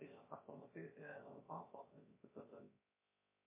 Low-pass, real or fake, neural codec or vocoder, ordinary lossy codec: 3.6 kHz; fake; codec, 16 kHz, 0.3 kbps, FocalCodec; MP3, 24 kbps